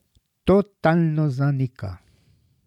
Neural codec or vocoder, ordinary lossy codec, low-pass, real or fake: vocoder, 44.1 kHz, 128 mel bands every 512 samples, BigVGAN v2; none; 19.8 kHz; fake